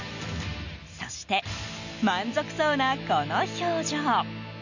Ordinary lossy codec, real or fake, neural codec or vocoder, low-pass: none; real; none; 7.2 kHz